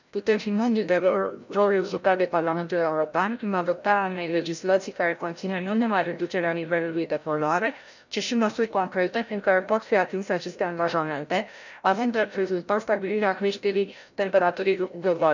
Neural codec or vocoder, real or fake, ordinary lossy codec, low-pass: codec, 16 kHz, 0.5 kbps, FreqCodec, larger model; fake; none; 7.2 kHz